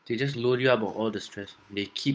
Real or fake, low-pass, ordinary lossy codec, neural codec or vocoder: fake; none; none; codec, 16 kHz, 8 kbps, FunCodec, trained on Chinese and English, 25 frames a second